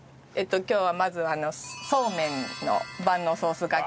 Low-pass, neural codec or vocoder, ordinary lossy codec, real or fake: none; none; none; real